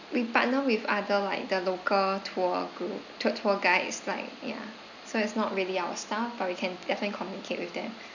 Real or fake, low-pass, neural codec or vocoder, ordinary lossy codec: real; 7.2 kHz; none; none